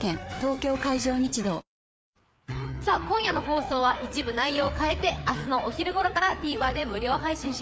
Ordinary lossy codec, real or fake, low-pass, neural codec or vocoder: none; fake; none; codec, 16 kHz, 4 kbps, FreqCodec, larger model